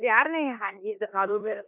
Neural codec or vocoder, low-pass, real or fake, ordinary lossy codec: codec, 16 kHz in and 24 kHz out, 0.9 kbps, LongCat-Audio-Codec, four codebook decoder; 3.6 kHz; fake; none